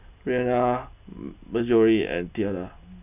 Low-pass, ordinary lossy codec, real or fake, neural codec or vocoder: 3.6 kHz; none; real; none